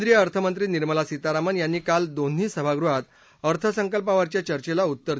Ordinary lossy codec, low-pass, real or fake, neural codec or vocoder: none; none; real; none